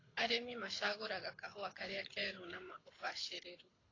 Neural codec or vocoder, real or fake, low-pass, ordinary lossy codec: codec, 24 kHz, 6 kbps, HILCodec; fake; 7.2 kHz; AAC, 32 kbps